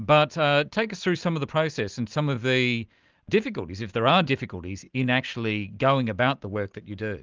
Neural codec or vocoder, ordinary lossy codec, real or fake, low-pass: none; Opus, 24 kbps; real; 7.2 kHz